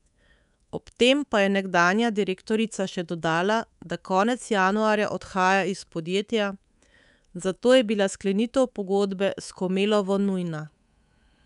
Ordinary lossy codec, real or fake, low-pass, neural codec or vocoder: none; fake; 10.8 kHz; codec, 24 kHz, 3.1 kbps, DualCodec